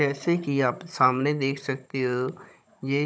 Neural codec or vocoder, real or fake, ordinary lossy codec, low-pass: codec, 16 kHz, 16 kbps, FunCodec, trained on Chinese and English, 50 frames a second; fake; none; none